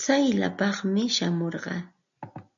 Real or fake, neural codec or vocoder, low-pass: real; none; 7.2 kHz